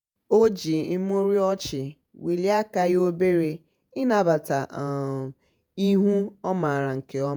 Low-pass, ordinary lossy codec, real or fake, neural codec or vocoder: none; none; fake; vocoder, 48 kHz, 128 mel bands, Vocos